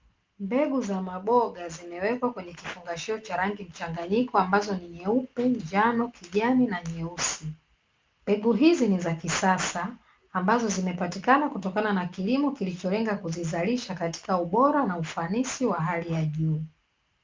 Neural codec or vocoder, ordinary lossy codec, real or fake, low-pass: none; Opus, 24 kbps; real; 7.2 kHz